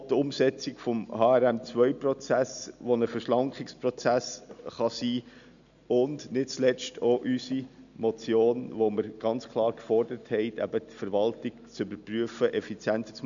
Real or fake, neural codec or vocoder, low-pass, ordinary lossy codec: real; none; 7.2 kHz; none